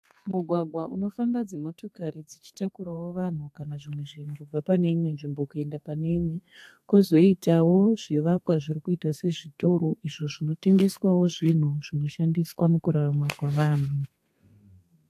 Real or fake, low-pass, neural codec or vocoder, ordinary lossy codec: fake; 14.4 kHz; codec, 32 kHz, 1.9 kbps, SNAC; AAC, 64 kbps